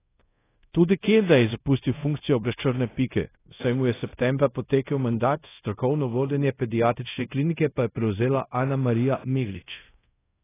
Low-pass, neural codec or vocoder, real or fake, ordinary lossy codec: 3.6 kHz; codec, 24 kHz, 0.5 kbps, DualCodec; fake; AAC, 16 kbps